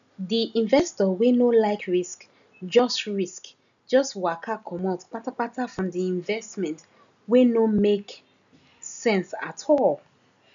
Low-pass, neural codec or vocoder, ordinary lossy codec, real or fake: 7.2 kHz; none; none; real